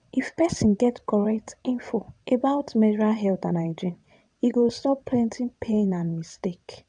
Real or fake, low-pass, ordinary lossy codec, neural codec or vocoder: fake; 9.9 kHz; none; vocoder, 22.05 kHz, 80 mel bands, WaveNeXt